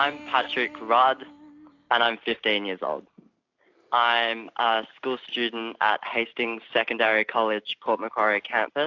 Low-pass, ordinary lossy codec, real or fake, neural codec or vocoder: 7.2 kHz; AAC, 48 kbps; real; none